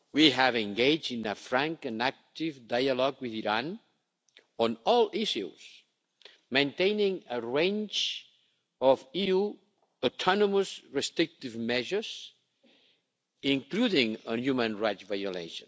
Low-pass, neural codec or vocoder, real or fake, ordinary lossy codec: none; none; real; none